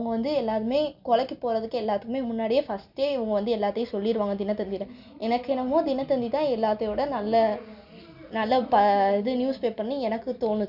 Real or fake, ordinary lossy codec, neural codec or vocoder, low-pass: real; none; none; 5.4 kHz